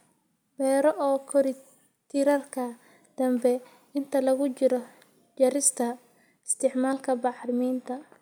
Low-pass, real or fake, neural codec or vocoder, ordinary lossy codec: none; real; none; none